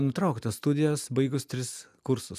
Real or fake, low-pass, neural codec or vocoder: real; 14.4 kHz; none